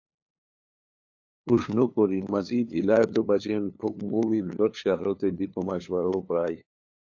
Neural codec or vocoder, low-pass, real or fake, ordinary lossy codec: codec, 16 kHz, 2 kbps, FunCodec, trained on LibriTTS, 25 frames a second; 7.2 kHz; fake; AAC, 48 kbps